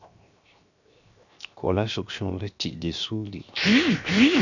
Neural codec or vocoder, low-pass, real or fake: codec, 16 kHz, 0.7 kbps, FocalCodec; 7.2 kHz; fake